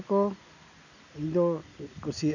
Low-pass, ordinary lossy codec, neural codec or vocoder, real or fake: 7.2 kHz; none; none; real